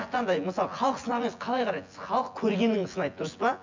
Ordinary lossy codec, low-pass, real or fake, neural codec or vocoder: MP3, 64 kbps; 7.2 kHz; fake; vocoder, 24 kHz, 100 mel bands, Vocos